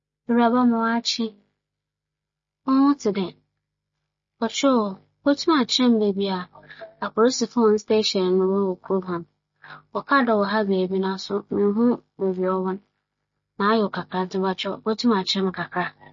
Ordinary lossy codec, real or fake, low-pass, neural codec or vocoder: MP3, 32 kbps; real; 7.2 kHz; none